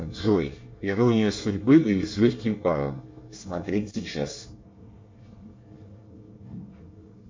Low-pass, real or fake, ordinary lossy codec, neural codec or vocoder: 7.2 kHz; fake; MP3, 48 kbps; codec, 24 kHz, 1 kbps, SNAC